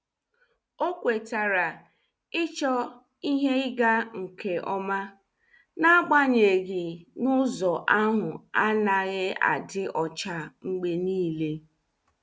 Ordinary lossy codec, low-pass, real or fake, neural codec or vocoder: none; none; real; none